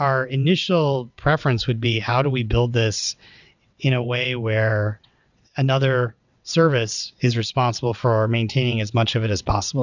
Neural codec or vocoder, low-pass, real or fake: vocoder, 22.05 kHz, 80 mel bands, WaveNeXt; 7.2 kHz; fake